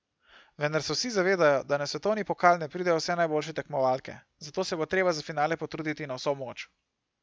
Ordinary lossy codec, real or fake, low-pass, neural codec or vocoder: none; real; none; none